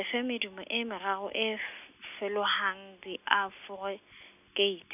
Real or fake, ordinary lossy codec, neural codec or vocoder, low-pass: real; none; none; 3.6 kHz